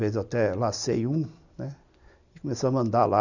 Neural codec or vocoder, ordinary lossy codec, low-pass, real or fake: none; none; 7.2 kHz; real